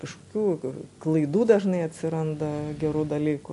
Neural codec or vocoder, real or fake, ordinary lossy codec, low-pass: none; real; MP3, 64 kbps; 10.8 kHz